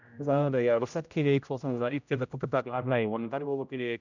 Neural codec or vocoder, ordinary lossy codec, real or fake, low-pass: codec, 16 kHz, 0.5 kbps, X-Codec, HuBERT features, trained on general audio; none; fake; 7.2 kHz